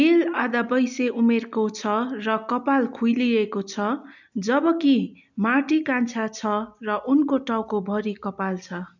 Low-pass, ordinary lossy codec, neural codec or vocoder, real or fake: 7.2 kHz; none; none; real